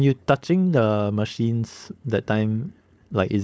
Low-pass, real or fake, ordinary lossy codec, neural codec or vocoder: none; fake; none; codec, 16 kHz, 4.8 kbps, FACodec